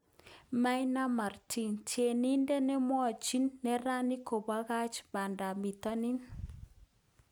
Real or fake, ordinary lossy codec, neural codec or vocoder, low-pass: real; none; none; none